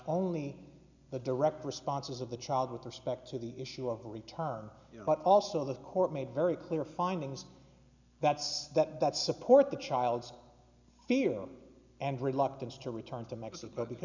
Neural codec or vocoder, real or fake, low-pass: none; real; 7.2 kHz